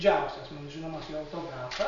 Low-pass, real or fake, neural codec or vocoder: 7.2 kHz; real; none